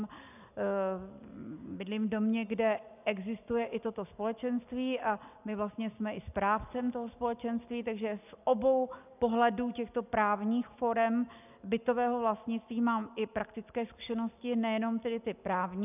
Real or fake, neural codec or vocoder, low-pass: real; none; 3.6 kHz